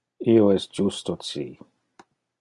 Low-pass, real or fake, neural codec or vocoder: 10.8 kHz; real; none